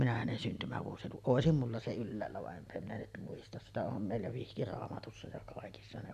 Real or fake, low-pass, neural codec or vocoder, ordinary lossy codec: real; 14.4 kHz; none; none